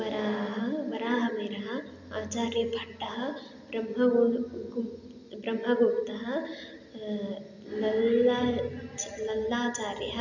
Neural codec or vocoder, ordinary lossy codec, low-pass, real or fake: none; none; 7.2 kHz; real